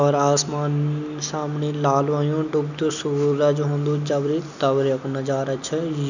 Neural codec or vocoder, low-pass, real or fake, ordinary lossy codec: none; 7.2 kHz; real; none